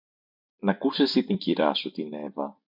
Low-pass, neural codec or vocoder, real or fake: 5.4 kHz; vocoder, 44.1 kHz, 128 mel bands every 256 samples, BigVGAN v2; fake